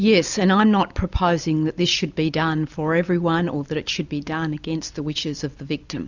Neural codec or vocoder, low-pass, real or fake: none; 7.2 kHz; real